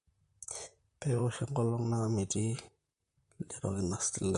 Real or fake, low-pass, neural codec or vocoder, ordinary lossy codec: fake; 14.4 kHz; vocoder, 44.1 kHz, 128 mel bands, Pupu-Vocoder; MP3, 48 kbps